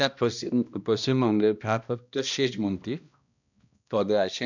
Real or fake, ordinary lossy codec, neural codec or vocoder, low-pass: fake; none; codec, 16 kHz, 1 kbps, X-Codec, HuBERT features, trained on balanced general audio; 7.2 kHz